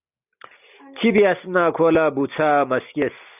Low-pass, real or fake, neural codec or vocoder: 3.6 kHz; real; none